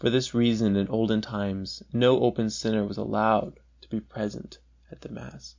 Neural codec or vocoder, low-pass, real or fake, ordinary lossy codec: none; 7.2 kHz; real; MP3, 48 kbps